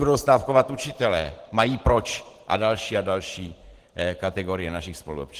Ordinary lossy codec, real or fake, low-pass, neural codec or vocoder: Opus, 16 kbps; fake; 14.4 kHz; vocoder, 44.1 kHz, 128 mel bands every 512 samples, BigVGAN v2